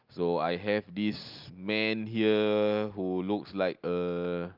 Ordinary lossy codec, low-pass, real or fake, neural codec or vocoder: Opus, 24 kbps; 5.4 kHz; real; none